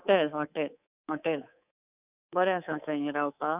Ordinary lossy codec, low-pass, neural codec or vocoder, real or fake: none; 3.6 kHz; codec, 24 kHz, 3.1 kbps, DualCodec; fake